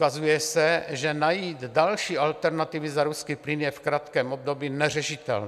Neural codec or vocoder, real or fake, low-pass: none; real; 14.4 kHz